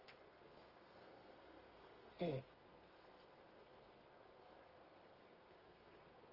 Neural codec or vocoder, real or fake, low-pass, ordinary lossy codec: codec, 32 kHz, 1.9 kbps, SNAC; fake; 5.4 kHz; Opus, 24 kbps